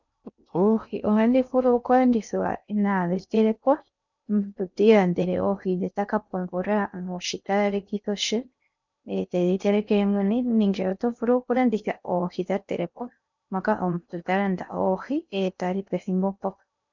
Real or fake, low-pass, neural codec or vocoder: fake; 7.2 kHz; codec, 16 kHz in and 24 kHz out, 0.6 kbps, FocalCodec, streaming, 2048 codes